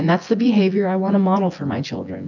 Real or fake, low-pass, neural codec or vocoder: fake; 7.2 kHz; vocoder, 24 kHz, 100 mel bands, Vocos